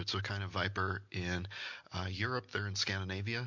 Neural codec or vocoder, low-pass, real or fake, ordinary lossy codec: none; 7.2 kHz; real; MP3, 48 kbps